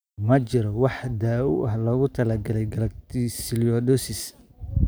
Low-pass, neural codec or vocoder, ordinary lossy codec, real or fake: none; none; none; real